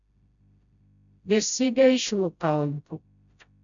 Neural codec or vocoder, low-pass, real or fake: codec, 16 kHz, 0.5 kbps, FreqCodec, smaller model; 7.2 kHz; fake